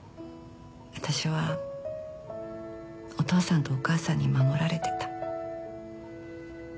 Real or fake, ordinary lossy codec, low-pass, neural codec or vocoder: real; none; none; none